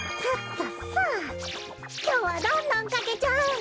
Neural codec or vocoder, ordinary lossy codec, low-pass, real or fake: none; none; none; real